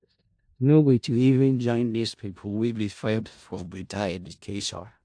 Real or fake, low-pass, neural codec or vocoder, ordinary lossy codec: fake; 9.9 kHz; codec, 16 kHz in and 24 kHz out, 0.4 kbps, LongCat-Audio-Codec, four codebook decoder; AAC, 64 kbps